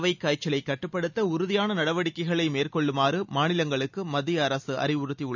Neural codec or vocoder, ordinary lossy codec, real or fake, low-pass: none; none; real; 7.2 kHz